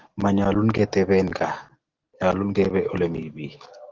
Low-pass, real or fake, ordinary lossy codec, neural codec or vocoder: 7.2 kHz; real; Opus, 16 kbps; none